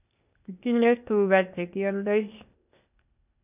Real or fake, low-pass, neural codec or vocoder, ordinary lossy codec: fake; 3.6 kHz; codec, 16 kHz, 0.8 kbps, ZipCodec; none